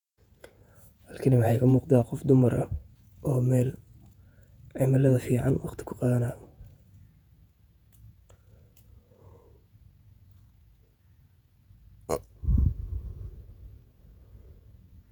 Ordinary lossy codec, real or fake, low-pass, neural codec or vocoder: none; fake; 19.8 kHz; vocoder, 48 kHz, 128 mel bands, Vocos